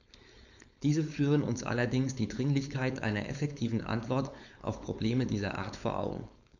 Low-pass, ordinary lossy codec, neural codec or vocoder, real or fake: 7.2 kHz; none; codec, 16 kHz, 4.8 kbps, FACodec; fake